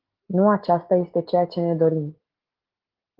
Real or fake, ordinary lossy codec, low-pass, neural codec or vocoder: real; Opus, 16 kbps; 5.4 kHz; none